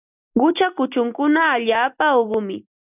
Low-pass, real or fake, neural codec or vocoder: 3.6 kHz; real; none